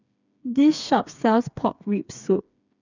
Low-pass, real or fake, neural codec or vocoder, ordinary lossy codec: 7.2 kHz; fake; codec, 16 kHz, 4 kbps, FreqCodec, smaller model; none